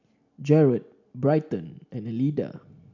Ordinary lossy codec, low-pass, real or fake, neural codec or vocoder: none; 7.2 kHz; real; none